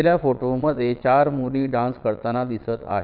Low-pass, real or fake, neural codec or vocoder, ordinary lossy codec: 5.4 kHz; fake; vocoder, 22.05 kHz, 80 mel bands, Vocos; none